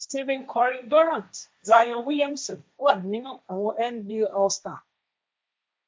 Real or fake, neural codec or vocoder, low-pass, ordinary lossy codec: fake; codec, 16 kHz, 1.1 kbps, Voila-Tokenizer; none; none